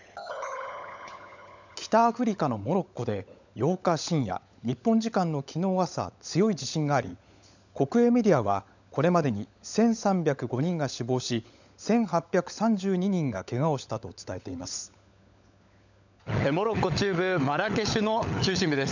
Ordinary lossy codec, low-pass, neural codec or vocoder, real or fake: none; 7.2 kHz; codec, 16 kHz, 16 kbps, FunCodec, trained on LibriTTS, 50 frames a second; fake